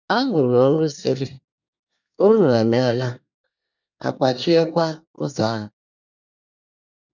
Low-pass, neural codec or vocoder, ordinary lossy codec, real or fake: 7.2 kHz; codec, 24 kHz, 1 kbps, SNAC; none; fake